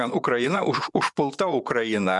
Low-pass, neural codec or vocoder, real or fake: 10.8 kHz; vocoder, 24 kHz, 100 mel bands, Vocos; fake